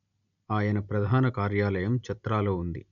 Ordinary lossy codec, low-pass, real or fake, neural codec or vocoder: none; 7.2 kHz; real; none